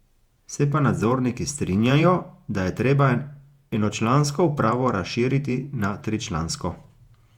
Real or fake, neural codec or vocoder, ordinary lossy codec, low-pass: fake; vocoder, 48 kHz, 128 mel bands, Vocos; Opus, 64 kbps; 19.8 kHz